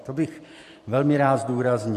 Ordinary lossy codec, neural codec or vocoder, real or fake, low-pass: MP3, 64 kbps; vocoder, 44.1 kHz, 128 mel bands every 512 samples, BigVGAN v2; fake; 14.4 kHz